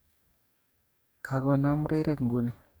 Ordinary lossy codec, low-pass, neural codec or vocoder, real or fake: none; none; codec, 44.1 kHz, 2.6 kbps, DAC; fake